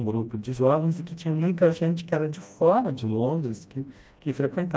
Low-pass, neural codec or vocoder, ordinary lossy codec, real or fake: none; codec, 16 kHz, 1 kbps, FreqCodec, smaller model; none; fake